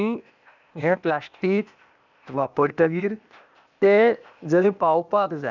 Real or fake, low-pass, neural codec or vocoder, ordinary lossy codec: fake; 7.2 kHz; codec, 16 kHz, 0.8 kbps, ZipCodec; none